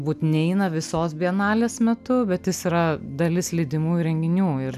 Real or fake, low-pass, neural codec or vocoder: real; 14.4 kHz; none